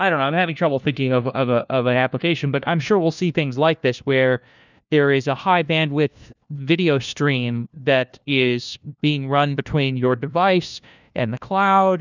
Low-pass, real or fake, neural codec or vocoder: 7.2 kHz; fake; codec, 16 kHz, 1 kbps, FunCodec, trained on LibriTTS, 50 frames a second